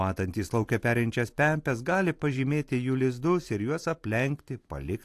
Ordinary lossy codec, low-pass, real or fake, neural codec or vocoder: AAC, 64 kbps; 14.4 kHz; fake; vocoder, 44.1 kHz, 128 mel bands every 256 samples, BigVGAN v2